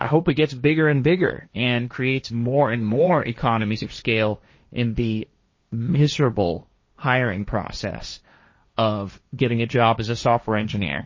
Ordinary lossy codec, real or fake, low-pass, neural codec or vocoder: MP3, 32 kbps; fake; 7.2 kHz; codec, 16 kHz, 1.1 kbps, Voila-Tokenizer